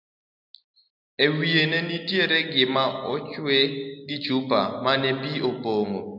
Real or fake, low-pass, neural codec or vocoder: real; 5.4 kHz; none